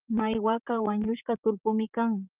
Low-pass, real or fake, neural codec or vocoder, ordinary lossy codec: 3.6 kHz; fake; vocoder, 44.1 kHz, 128 mel bands, Pupu-Vocoder; Opus, 24 kbps